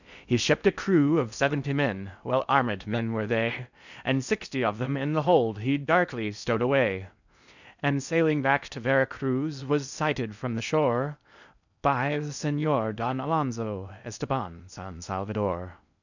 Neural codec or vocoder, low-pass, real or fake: codec, 16 kHz in and 24 kHz out, 0.6 kbps, FocalCodec, streaming, 4096 codes; 7.2 kHz; fake